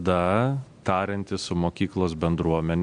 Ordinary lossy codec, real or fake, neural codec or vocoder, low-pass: MP3, 64 kbps; real; none; 9.9 kHz